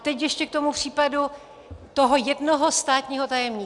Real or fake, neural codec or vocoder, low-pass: real; none; 10.8 kHz